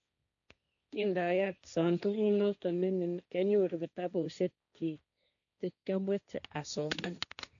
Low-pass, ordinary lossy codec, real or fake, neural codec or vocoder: 7.2 kHz; none; fake; codec, 16 kHz, 1.1 kbps, Voila-Tokenizer